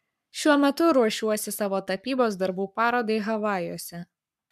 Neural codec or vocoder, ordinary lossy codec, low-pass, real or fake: codec, 44.1 kHz, 7.8 kbps, Pupu-Codec; MP3, 96 kbps; 14.4 kHz; fake